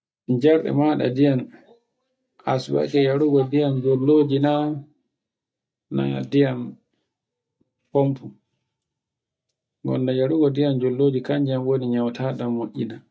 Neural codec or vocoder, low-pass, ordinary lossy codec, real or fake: none; none; none; real